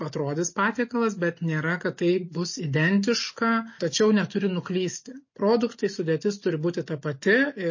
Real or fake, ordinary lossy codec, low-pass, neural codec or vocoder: real; MP3, 32 kbps; 7.2 kHz; none